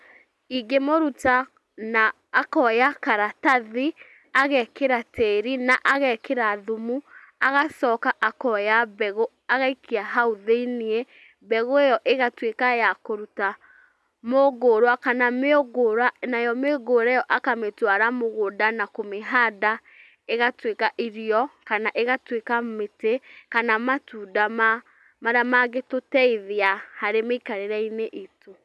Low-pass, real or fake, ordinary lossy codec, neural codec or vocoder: none; real; none; none